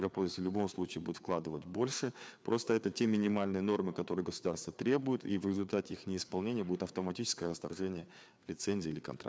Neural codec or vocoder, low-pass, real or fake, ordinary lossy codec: codec, 16 kHz, 4 kbps, FreqCodec, larger model; none; fake; none